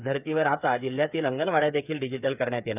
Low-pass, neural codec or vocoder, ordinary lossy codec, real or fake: 3.6 kHz; codec, 16 kHz, 8 kbps, FreqCodec, smaller model; none; fake